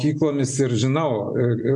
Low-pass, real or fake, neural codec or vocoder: 10.8 kHz; real; none